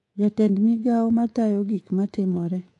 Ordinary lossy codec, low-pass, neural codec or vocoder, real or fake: AAC, 48 kbps; 10.8 kHz; codec, 24 kHz, 3.1 kbps, DualCodec; fake